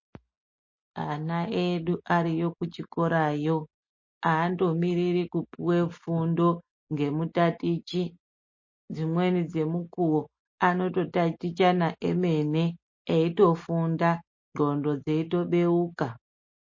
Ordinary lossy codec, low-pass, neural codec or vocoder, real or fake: MP3, 32 kbps; 7.2 kHz; none; real